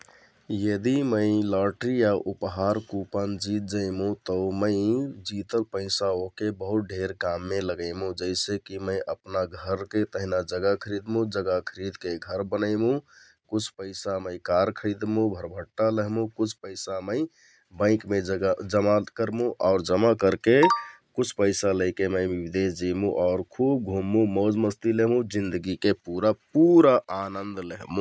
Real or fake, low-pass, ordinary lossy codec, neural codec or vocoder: real; none; none; none